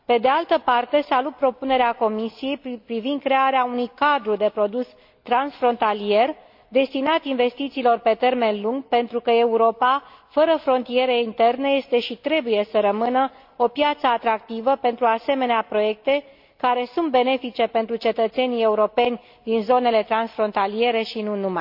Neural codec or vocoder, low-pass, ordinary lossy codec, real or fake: none; 5.4 kHz; MP3, 48 kbps; real